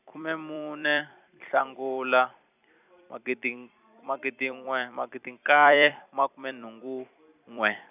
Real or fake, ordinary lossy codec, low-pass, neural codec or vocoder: fake; none; 3.6 kHz; vocoder, 44.1 kHz, 128 mel bands every 256 samples, BigVGAN v2